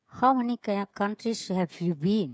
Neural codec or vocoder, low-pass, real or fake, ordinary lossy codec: codec, 16 kHz, 16 kbps, FreqCodec, smaller model; none; fake; none